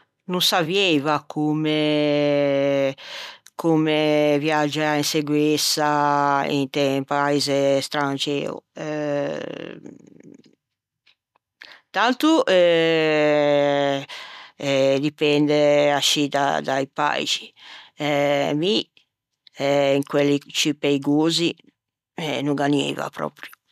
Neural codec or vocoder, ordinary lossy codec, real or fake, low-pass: none; none; real; 14.4 kHz